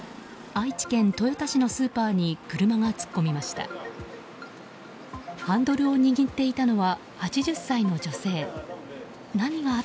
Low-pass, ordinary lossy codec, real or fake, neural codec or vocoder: none; none; real; none